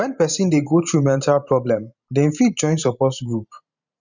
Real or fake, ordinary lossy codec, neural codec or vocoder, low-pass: fake; none; vocoder, 44.1 kHz, 128 mel bands every 512 samples, BigVGAN v2; 7.2 kHz